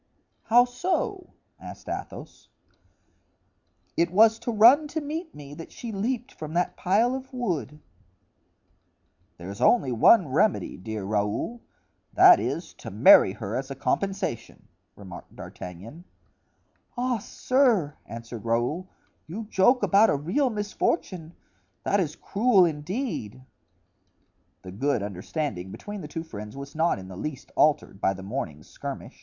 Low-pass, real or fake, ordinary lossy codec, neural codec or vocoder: 7.2 kHz; real; MP3, 64 kbps; none